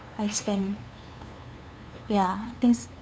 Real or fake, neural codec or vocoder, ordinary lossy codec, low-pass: fake; codec, 16 kHz, 2 kbps, FunCodec, trained on LibriTTS, 25 frames a second; none; none